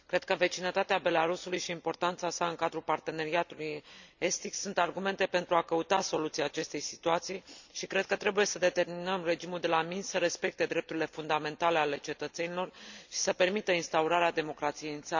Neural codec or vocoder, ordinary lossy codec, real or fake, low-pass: none; none; real; 7.2 kHz